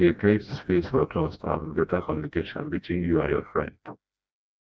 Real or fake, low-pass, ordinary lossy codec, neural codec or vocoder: fake; none; none; codec, 16 kHz, 1 kbps, FreqCodec, smaller model